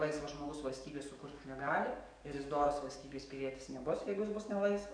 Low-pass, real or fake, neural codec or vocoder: 9.9 kHz; fake; codec, 44.1 kHz, 7.8 kbps, DAC